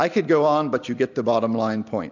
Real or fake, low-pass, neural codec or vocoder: real; 7.2 kHz; none